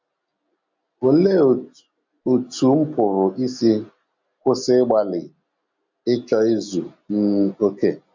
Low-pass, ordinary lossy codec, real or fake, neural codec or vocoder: 7.2 kHz; MP3, 64 kbps; real; none